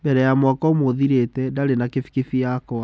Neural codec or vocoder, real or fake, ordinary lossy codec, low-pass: none; real; none; none